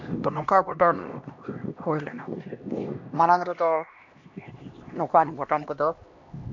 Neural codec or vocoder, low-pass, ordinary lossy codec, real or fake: codec, 16 kHz, 1 kbps, X-Codec, HuBERT features, trained on LibriSpeech; 7.2 kHz; MP3, 48 kbps; fake